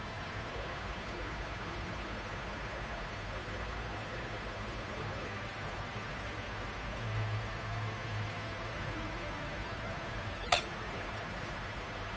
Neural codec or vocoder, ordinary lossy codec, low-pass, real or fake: codec, 16 kHz, 2 kbps, FunCodec, trained on Chinese and English, 25 frames a second; none; none; fake